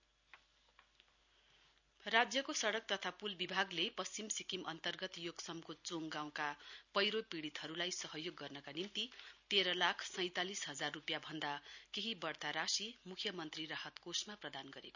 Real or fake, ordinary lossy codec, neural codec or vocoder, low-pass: real; none; none; 7.2 kHz